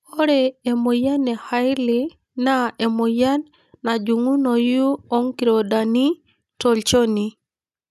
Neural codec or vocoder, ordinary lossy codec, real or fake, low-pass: none; none; real; 14.4 kHz